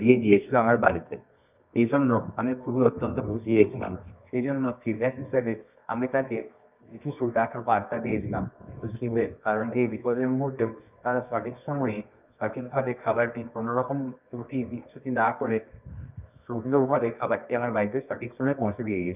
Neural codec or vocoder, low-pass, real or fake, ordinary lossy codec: codec, 24 kHz, 0.9 kbps, WavTokenizer, medium music audio release; 3.6 kHz; fake; none